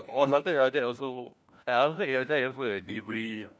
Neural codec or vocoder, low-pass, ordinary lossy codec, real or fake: codec, 16 kHz, 1 kbps, FunCodec, trained on LibriTTS, 50 frames a second; none; none; fake